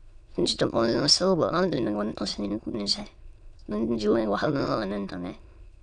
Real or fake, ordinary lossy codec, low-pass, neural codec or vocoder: fake; none; 9.9 kHz; autoencoder, 22.05 kHz, a latent of 192 numbers a frame, VITS, trained on many speakers